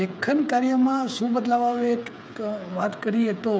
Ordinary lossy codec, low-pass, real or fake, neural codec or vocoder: none; none; fake; codec, 16 kHz, 8 kbps, FreqCodec, smaller model